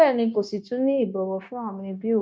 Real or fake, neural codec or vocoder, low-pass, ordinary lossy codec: fake; codec, 16 kHz, 0.9 kbps, LongCat-Audio-Codec; none; none